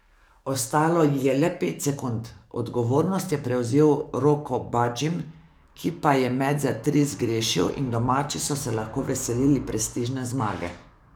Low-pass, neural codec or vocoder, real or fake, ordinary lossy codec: none; codec, 44.1 kHz, 7.8 kbps, DAC; fake; none